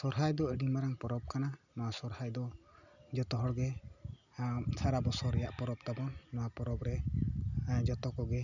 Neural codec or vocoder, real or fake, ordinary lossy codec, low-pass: none; real; none; 7.2 kHz